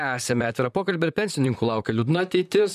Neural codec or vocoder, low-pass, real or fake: vocoder, 44.1 kHz, 128 mel bands, Pupu-Vocoder; 14.4 kHz; fake